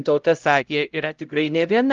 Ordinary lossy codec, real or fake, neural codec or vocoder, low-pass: Opus, 32 kbps; fake; codec, 16 kHz, 0.5 kbps, X-Codec, HuBERT features, trained on LibriSpeech; 7.2 kHz